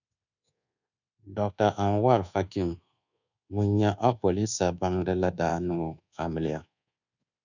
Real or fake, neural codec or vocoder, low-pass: fake; codec, 24 kHz, 1.2 kbps, DualCodec; 7.2 kHz